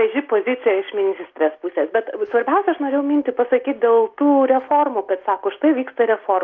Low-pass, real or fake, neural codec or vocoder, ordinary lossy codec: 7.2 kHz; real; none; Opus, 24 kbps